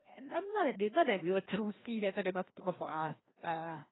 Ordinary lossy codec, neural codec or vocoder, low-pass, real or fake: AAC, 16 kbps; codec, 16 kHz, 1 kbps, FreqCodec, larger model; 7.2 kHz; fake